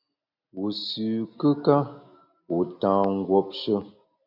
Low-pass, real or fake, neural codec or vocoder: 5.4 kHz; real; none